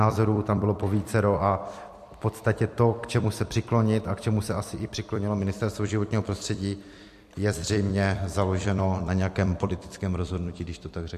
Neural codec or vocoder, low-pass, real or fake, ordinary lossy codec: vocoder, 44.1 kHz, 128 mel bands every 256 samples, BigVGAN v2; 14.4 kHz; fake; MP3, 64 kbps